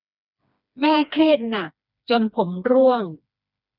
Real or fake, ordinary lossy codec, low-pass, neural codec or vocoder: fake; none; 5.4 kHz; codec, 16 kHz, 2 kbps, FreqCodec, smaller model